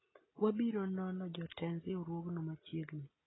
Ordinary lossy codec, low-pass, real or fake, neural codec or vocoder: AAC, 16 kbps; 7.2 kHz; real; none